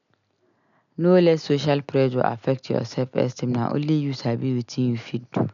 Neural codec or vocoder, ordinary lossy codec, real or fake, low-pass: none; none; real; 7.2 kHz